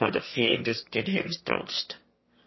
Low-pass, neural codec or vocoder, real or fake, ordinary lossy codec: 7.2 kHz; autoencoder, 22.05 kHz, a latent of 192 numbers a frame, VITS, trained on one speaker; fake; MP3, 24 kbps